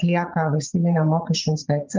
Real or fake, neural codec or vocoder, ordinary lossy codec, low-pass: fake; vocoder, 22.05 kHz, 80 mel bands, Vocos; Opus, 32 kbps; 7.2 kHz